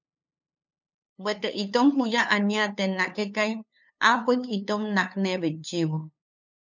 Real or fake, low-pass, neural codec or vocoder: fake; 7.2 kHz; codec, 16 kHz, 2 kbps, FunCodec, trained on LibriTTS, 25 frames a second